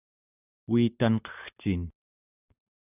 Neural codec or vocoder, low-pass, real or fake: none; 3.6 kHz; real